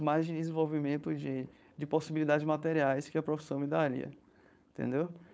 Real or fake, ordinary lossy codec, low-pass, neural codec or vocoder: fake; none; none; codec, 16 kHz, 4.8 kbps, FACodec